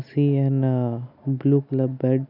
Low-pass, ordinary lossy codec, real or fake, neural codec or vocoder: 5.4 kHz; none; real; none